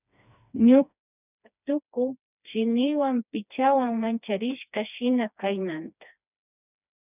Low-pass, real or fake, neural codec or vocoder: 3.6 kHz; fake; codec, 16 kHz, 2 kbps, FreqCodec, smaller model